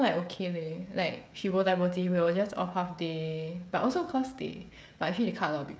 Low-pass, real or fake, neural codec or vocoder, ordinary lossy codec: none; fake; codec, 16 kHz, 16 kbps, FreqCodec, smaller model; none